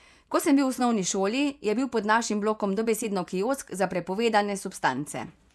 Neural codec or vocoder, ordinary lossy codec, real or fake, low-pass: vocoder, 24 kHz, 100 mel bands, Vocos; none; fake; none